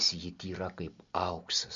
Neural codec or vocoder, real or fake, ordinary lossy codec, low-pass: none; real; MP3, 48 kbps; 7.2 kHz